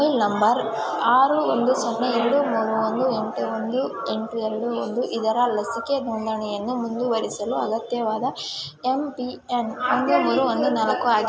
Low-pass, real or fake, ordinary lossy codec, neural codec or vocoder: none; real; none; none